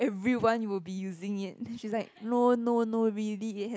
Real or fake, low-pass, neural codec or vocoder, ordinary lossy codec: real; none; none; none